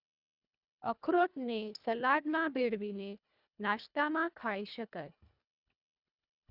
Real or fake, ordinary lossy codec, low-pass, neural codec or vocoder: fake; none; 5.4 kHz; codec, 24 kHz, 1.5 kbps, HILCodec